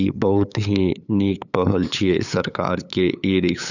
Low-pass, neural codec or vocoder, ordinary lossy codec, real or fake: 7.2 kHz; codec, 16 kHz, 8 kbps, FunCodec, trained on LibriTTS, 25 frames a second; none; fake